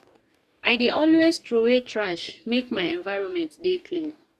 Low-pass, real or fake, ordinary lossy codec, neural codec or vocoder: 14.4 kHz; fake; Opus, 64 kbps; codec, 44.1 kHz, 2.6 kbps, DAC